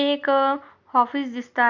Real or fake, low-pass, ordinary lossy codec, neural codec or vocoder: real; 7.2 kHz; none; none